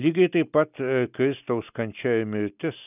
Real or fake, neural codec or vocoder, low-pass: real; none; 3.6 kHz